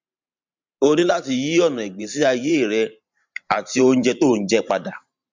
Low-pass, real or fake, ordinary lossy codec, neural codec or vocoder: 7.2 kHz; fake; MP3, 64 kbps; vocoder, 44.1 kHz, 128 mel bands every 512 samples, BigVGAN v2